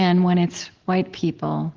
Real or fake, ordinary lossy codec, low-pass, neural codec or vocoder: real; Opus, 16 kbps; 7.2 kHz; none